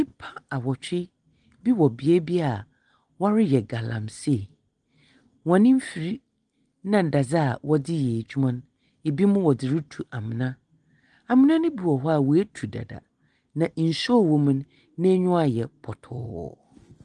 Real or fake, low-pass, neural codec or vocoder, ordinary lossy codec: real; 9.9 kHz; none; Opus, 24 kbps